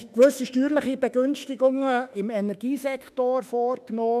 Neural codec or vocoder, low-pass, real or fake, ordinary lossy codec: autoencoder, 48 kHz, 32 numbers a frame, DAC-VAE, trained on Japanese speech; 14.4 kHz; fake; none